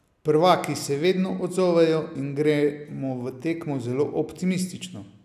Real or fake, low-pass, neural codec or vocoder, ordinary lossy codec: real; 14.4 kHz; none; none